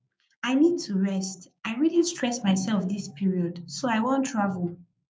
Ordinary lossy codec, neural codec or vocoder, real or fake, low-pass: none; codec, 16 kHz, 6 kbps, DAC; fake; none